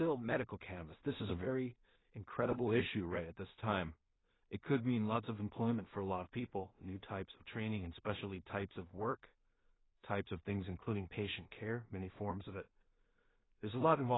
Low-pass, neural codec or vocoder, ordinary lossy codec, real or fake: 7.2 kHz; codec, 16 kHz in and 24 kHz out, 0.4 kbps, LongCat-Audio-Codec, two codebook decoder; AAC, 16 kbps; fake